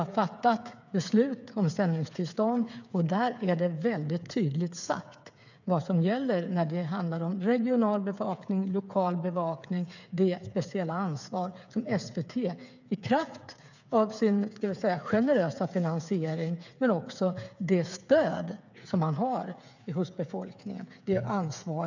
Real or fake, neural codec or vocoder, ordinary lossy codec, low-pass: fake; codec, 16 kHz, 8 kbps, FreqCodec, smaller model; none; 7.2 kHz